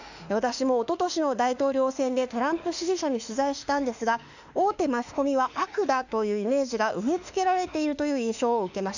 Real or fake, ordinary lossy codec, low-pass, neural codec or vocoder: fake; none; 7.2 kHz; autoencoder, 48 kHz, 32 numbers a frame, DAC-VAE, trained on Japanese speech